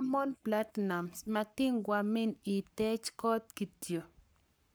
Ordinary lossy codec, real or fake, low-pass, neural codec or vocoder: none; fake; none; codec, 44.1 kHz, 7.8 kbps, Pupu-Codec